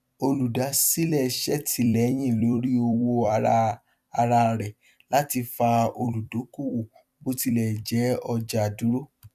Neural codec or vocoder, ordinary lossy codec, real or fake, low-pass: vocoder, 44.1 kHz, 128 mel bands every 256 samples, BigVGAN v2; none; fake; 14.4 kHz